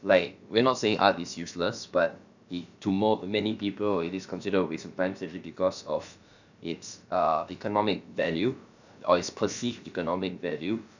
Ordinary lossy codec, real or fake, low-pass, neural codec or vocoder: none; fake; 7.2 kHz; codec, 16 kHz, about 1 kbps, DyCAST, with the encoder's durations